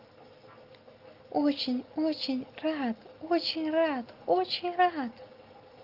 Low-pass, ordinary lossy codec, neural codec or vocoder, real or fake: 5.4 kHz; Opus, 24 kbps; none; real